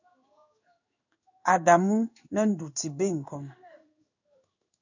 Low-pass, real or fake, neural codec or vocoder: 7.2 kHz; fake; codec, 16 kHz in and 24 kHz out, 1 kbps, XY-Tokenizer